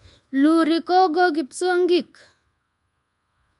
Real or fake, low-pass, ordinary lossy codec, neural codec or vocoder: fake; 10.8 kHz; MP3, 64 kbps; codec, 24 kHz, 1.2 kbps, DualCodec